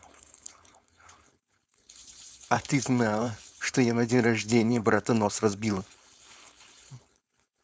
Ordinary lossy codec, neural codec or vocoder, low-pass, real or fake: none; codec, 16 kHz, 4.8 kbps, FACodec; none; fake